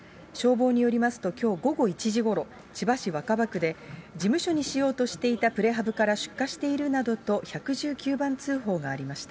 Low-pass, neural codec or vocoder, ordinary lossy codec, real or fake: none; none; none; real